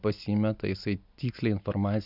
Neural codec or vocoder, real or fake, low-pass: none; real; 5.4 kHz